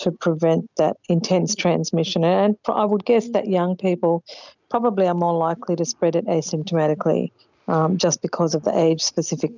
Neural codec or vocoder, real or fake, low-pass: none; real; 7.2 kHz